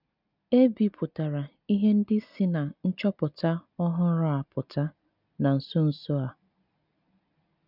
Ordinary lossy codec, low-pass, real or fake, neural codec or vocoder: none; 5.4 kHz; real; none